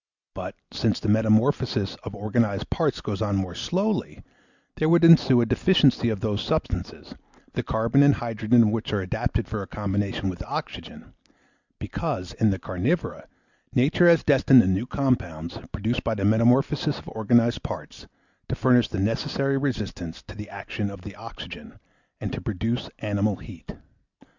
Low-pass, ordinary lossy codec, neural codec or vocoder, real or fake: 7.2 kHz; Opus, 64 kbps; none; real